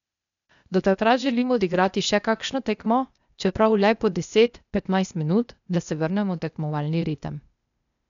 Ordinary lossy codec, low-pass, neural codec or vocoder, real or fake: none; 7.2 kHz; codec, 16 kHz, 0.8 kbps, ZipCodec; fake